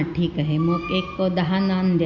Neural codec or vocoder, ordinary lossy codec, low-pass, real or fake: none; none; 7.2 kHz; real